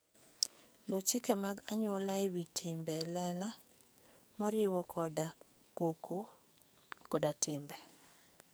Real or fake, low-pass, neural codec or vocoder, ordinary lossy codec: fake; none; codec, 44.1 kHz, 2.6 kbps, SNAC; none